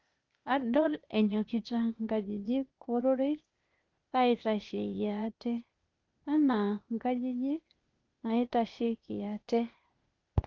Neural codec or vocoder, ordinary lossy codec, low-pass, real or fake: codec, 16 kHz, 0.8 kbps, ZipCodec; Opus, 32 kbps; 7.2 kHz; fake